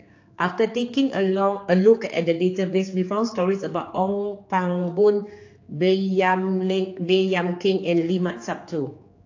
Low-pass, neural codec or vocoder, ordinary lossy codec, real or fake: 7.2 kHz; codec, 16 kHz, 4 kbps, X-Codec, HuBERT features, trained on general audio; AAC, 48 kbps; fake